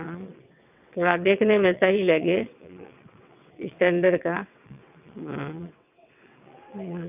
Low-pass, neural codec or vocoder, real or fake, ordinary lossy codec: 3.6 kHz; vocoder, 22.05 kHz, 80 mel bands, WaveNeXt; fake; none